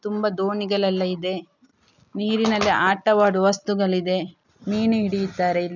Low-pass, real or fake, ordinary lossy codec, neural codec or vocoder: 7.2 kHz; real; none; none